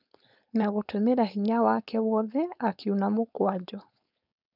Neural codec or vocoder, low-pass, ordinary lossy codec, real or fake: codec, 16 kHz, 4.8 kbps, FACodec; 5.4 kHz; none; fake